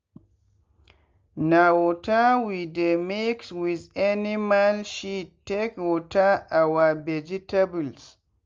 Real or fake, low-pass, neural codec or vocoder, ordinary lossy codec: real; 7.2 kHz; none; Opus, 32 kbps